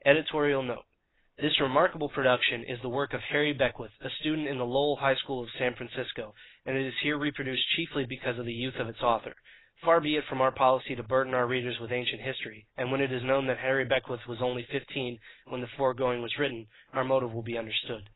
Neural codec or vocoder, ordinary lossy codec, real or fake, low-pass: none; AAC, 16 kbps; real; 7.2 kHz